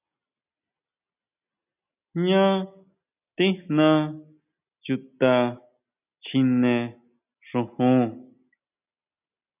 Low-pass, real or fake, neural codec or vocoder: 3.6 kHz; real; none